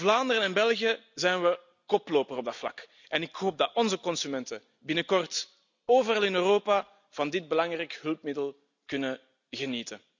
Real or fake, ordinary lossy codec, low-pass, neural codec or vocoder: real; none; 7.2 kHz; none